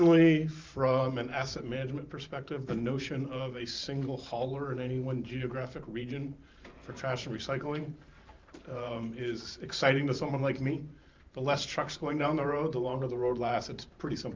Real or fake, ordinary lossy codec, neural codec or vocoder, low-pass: fake; Opus, 24 kbps; vocoder, 44.1 kHz, 128 mel bands every 512 samples, BigVGAN v2; 7.2 kHz